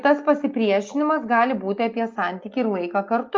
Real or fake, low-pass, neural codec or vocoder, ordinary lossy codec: real; 7.2 kHz; none; Opus, 24 kbps